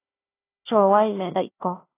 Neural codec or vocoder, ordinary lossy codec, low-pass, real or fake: codec, 16 kHz, 1 kbps, FunCodec, trained on Chinese and English, 50 frames a second; AAC, 16 kbps; 3.6 kHz; fake